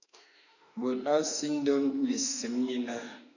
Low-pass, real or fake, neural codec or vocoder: 7.2 kHz; fake; autoencoder, 48 kHz, 32 numbers a frame, DAC-VAE, trained on Japanese speech